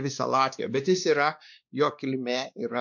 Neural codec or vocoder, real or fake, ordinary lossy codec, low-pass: codec, 16 kHz, 2 kbps, X-Codec, WavLM features, trained on Multilingual LibriSpeech; fake; MP3, 64 kbps; 7.2 kHz